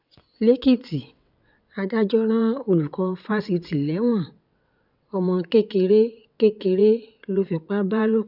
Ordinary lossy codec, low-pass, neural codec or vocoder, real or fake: none; 5.4 kHz; vocoder, 22.05 kHz, 80 mel bands, WaveNeXt; fake